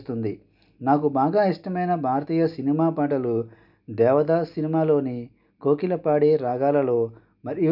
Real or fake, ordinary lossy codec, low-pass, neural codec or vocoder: real; none; 5.4 kHz; none